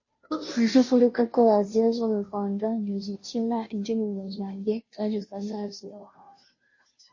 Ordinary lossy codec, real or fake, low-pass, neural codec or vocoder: MP3, 32 kbps; fake; 7.2 kHz; codec, 16 kHz, 0.5 kbps, FunCodec, trained on Chinese and English, 25 frames a second